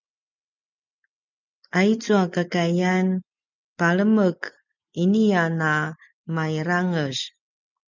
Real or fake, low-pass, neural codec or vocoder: real; 7.2 kHz; none